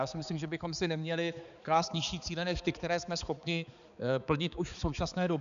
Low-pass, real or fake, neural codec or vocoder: 7.2 kHz; fake; codec, 16 kHz, 4 kbps, X-Codec, HuBERT features, trained on balanced general audio